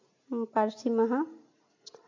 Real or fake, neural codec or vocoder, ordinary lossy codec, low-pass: real; none; MP3, 48 kbps; 7.2 kHz